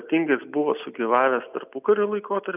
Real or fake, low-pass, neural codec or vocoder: real; 3.6 kHz; none